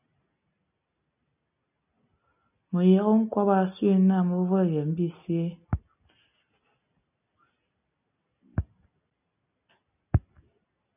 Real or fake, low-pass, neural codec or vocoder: real; 3.6 kHz; none